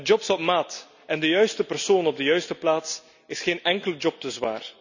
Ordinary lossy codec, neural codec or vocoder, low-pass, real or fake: none; none; 7.2 kHz; real